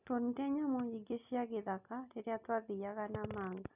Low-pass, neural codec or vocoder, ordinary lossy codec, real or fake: 3.6 kHz; none; none; real